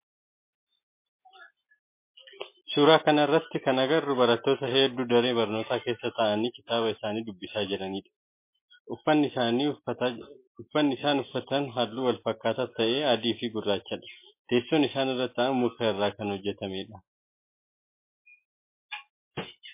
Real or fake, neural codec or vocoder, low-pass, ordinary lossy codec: real; none; 3.6 kHz; MP3, 24 kbps